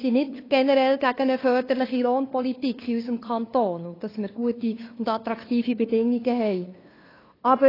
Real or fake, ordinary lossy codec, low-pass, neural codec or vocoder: fake; AAC, 24 kbps; 5.4 kHz; codec, 16 kHz, 2 kbps, FunCodec, trained on LibriTTS, 25 frames a second